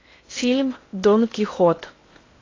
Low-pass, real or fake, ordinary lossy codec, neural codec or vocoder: 7.2 kHz; fake; AAC, 32 kbps; codec, 16 kHz in and 24 kHz out, 0.6 kbps, FocalCodec, streaming, 2048 codes